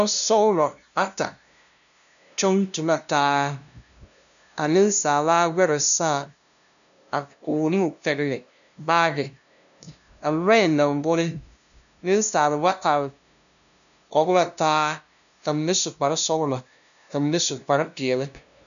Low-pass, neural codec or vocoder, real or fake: 7.2 kHz; codec, 16 kHz, 0.5 kbps, FunCodec, trained on LibriTTS, 25 frames a second; fake